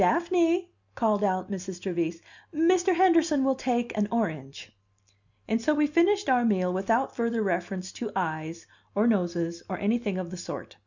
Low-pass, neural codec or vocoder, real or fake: 7.2 kHz; none; real